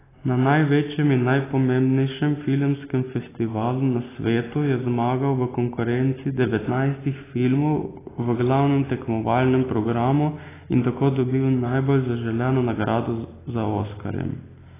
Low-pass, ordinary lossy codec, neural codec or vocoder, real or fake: 3.6 kHz; AAC, 16 kbps; none; real